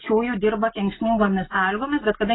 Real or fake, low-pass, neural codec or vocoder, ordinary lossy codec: real; 7.2 kHz; none; AAC, 16 kbps